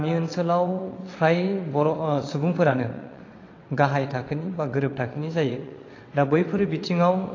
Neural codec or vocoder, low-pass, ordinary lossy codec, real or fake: vocoder, 22.05 kHz, 80 mel bands, WaveNeXt; 7.2 kHz; AAC, 32 kbps; fake